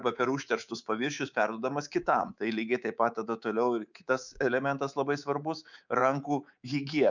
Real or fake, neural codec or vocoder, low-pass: fake; codec, 24 kHz, 3.1 kbps, DualCodec; 7.2 kHz